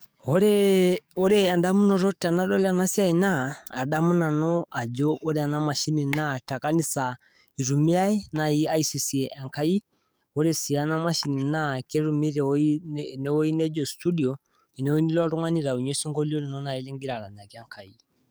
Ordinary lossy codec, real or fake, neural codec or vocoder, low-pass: none; fake; codec, 44.1 kHz, 7.8 kbps, DAC; none